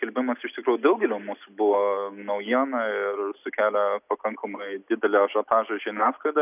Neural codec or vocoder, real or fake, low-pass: none; real; 3.6 kHz